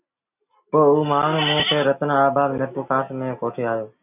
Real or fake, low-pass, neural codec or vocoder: real; 3.6 kHz; none